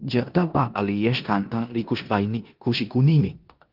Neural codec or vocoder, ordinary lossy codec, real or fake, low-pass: codec, 16 kHz in and 24 kHz out, 0.9 kbps, LongCat-Audio-Codec, four codebook decoder; Opus, 32 kbps; fake; 5.4 kHz